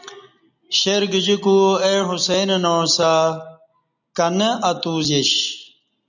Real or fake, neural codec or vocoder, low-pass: real; none; 7.2 kHz